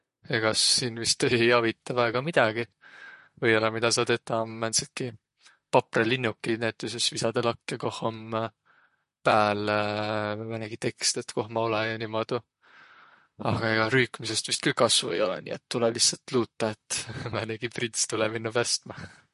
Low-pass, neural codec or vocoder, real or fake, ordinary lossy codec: 14.4 kHz; vocoder, 44.1 kHz, 128 mel bands, Pupu-Vocoder; fake; MP3, 48 kbps